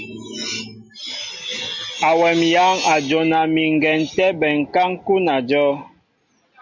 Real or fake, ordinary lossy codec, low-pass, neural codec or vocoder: real; MP3, 64 kbps; 7.2 kHz; none